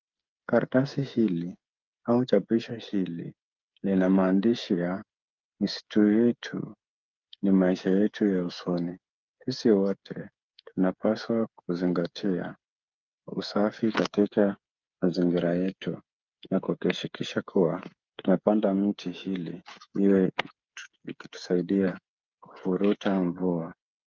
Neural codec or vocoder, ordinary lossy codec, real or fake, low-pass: codec, 16 kHz, 8 kbps, FreqCodec, smaller model; Opus, 24 kbps; fake; 7.2 kHz